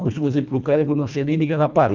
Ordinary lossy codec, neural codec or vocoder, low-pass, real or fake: none; codec, 24 kHz, 1.5 kbps, HILCodec; 7.2 kHz; fake